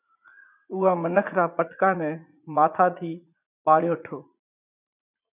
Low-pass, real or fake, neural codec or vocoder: 3.6 kHz; fake; vocoder, 44.1 kHz, 80 mel bands, Vocos